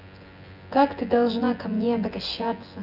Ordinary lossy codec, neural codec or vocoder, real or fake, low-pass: none; vocoder, 24 kHz, 100 mel bands, Vocos; fake; 5.4 kHz